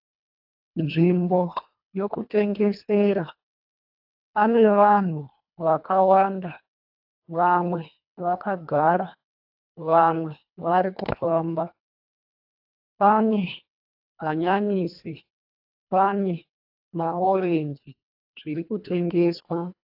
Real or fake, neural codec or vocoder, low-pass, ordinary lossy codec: fake; codec, 24 kHz, 1.5 kbps, HILCodec; 5.4 kHz; AAC, 48 kbps